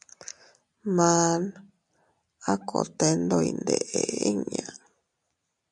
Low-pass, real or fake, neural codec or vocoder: 10.8 kHz; real; none